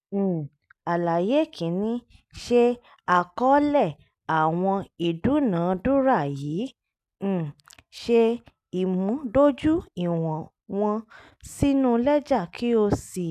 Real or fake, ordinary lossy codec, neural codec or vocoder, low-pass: real; none; none; 14.4 kHz